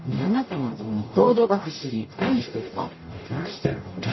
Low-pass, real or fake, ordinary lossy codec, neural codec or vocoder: 7.2 kHz; fake; MP3, 24 kbps; codec, 44.1 kHz, 0.9 kbps, DAC